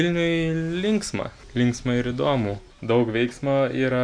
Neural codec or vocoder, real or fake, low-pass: none; real; 9.9 kHz